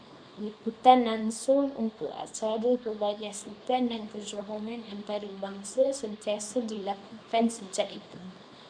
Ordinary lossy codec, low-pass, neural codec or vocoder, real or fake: MP3, 96 kbps; 9.9 kHz; codec, 24 kHz, 0.9 kbps, WavTokenizer, small release; fake